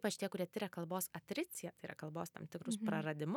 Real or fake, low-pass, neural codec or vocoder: real; 19.8 kHz; none